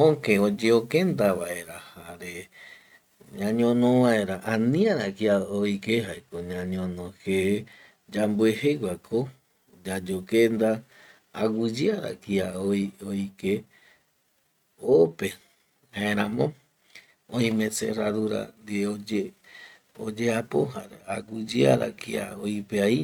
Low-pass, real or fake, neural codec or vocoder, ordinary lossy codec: 19.8 kHz; real; none; none